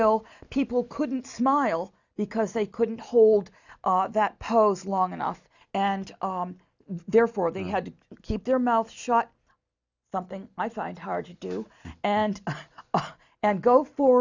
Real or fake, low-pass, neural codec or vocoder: real; 7.2 kHz; none